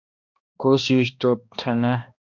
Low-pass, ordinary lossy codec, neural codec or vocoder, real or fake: 7.2 kHz; MP3, 64 kbps; codec, 16 kHz, 1 kbps, X-Codec, HuBERT features, trained on balanced general audio; fake